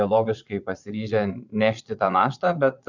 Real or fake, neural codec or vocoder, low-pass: fake; vocoder, 22.05 kHz, 80 mel bands, Vocos; 7.2 kHz